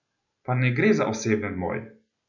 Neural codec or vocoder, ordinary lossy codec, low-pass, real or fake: none; none; 7.2 kHz; real